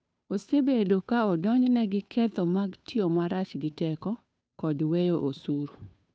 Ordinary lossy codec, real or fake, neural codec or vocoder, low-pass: none; fake; codec, 16 kHz, 2 kbps, FunCodec, trained on Chinese and English, 25 frames a second; none